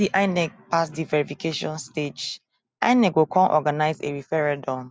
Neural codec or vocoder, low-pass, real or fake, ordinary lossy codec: none; none; real; none